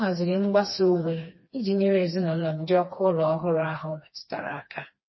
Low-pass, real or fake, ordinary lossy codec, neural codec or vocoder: 7.2 kHz; fake; MP3, 24 kbps; codec, 16 kHz, 2 kbps, FreqCodec, smaller model